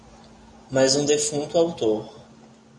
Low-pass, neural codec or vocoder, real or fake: 10.8 kHz; none; real